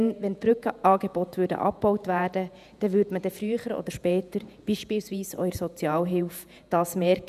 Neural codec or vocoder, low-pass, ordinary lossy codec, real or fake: vocoder, 48 kHz, 128 mel bands, Vocos; 14.4 kHz; none; fake